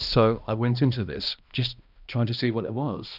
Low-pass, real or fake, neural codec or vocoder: 5.4 kHz; fake; codec, 16 kHz, 1 kbps, X-Codec, HuBERT features, trained on balanced general audio